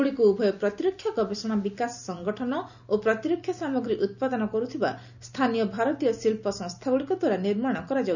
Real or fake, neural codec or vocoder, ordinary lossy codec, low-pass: real; none; none; 7.2 kHz